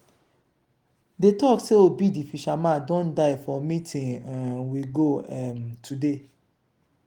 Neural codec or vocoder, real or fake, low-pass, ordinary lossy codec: none; real; 19.8 kHz; Opus, 24 kbps